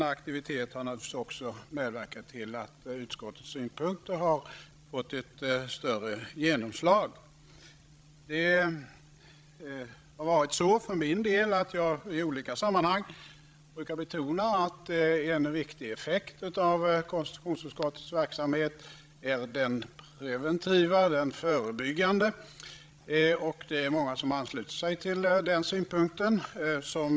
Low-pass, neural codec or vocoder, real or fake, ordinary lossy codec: none; codec, 16 kHz, 16 kbps, FreqCodec, larger model; fake; none